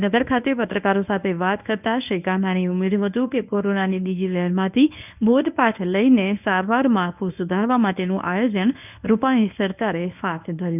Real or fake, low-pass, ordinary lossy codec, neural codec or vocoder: fake; 3.6 kHz; none; codec, 24 kHz, 0.9 kbps, WavTokenizer, medium speech release version 1